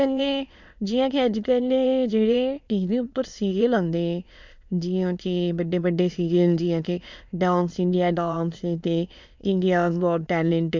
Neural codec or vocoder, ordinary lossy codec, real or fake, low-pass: autoencoder, 22.05 kHz, a latent of 192 numbers a frame, VITS, trained on many speakers; MP3, 64 kbps; fake; 7.2 kHz